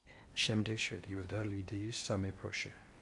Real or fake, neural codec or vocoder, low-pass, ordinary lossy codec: fake; codec, 16 kHz in and 24 kHz out, 0.6 kbps, FocalCodec, streaming, 4096 codes; 10.8 kHz; MP3, 64 kbps